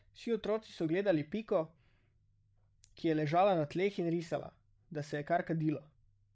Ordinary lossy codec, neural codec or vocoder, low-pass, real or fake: none; codec, 16 kHz, 16 kbps, FreqCodec, larger model; none; fake